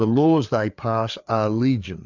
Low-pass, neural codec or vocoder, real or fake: 7.2 kHz; codec, 16 kHz, 4 kbps, FreqCodec, larger model; fake